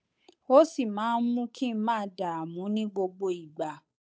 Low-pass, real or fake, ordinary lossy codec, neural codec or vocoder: none; fake; none; codec, 16 kHz, 8 kbps, FunCodec, trained on Chinese and English, 25 frames a second